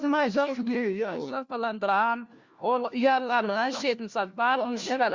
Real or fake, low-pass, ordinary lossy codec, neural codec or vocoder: fake; 7.2 kHz; Opus, 64 kbps; codec, 16 kHz, 1 kbps, FunCodec, trained on LibriTTS, 50 frames a second